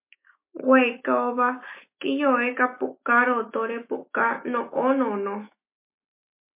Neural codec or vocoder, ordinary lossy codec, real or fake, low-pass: autoencoder, 48 kHz, 128 numbers a frame, DAC-VAE, trained on Japanese speech; MP3, 24 kbps; fake; 3.6 kHz